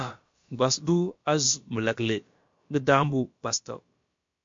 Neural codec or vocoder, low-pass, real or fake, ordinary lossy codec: codec, 16 kHz, about 1 kbps, DyCAST, with the encoder's durations; 7.2 kHz; fake; MP3, 48 kbps